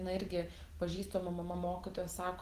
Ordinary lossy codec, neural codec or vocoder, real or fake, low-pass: Opus, 16 kbps; none; real; 14.4 kHz